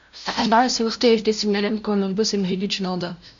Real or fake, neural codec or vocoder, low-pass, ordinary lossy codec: fake; codec, 16 kHz, 0.5 kbps, FunCodec, trained on LibriTTS, 25 frames a second; 7.2 kHz; MP3, 64 kbps